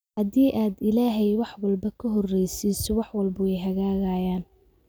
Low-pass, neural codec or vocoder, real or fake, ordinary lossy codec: none; none; real; none